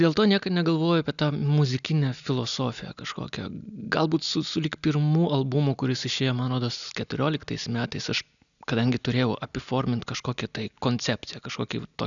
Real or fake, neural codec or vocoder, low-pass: real; none; 7.2 kHz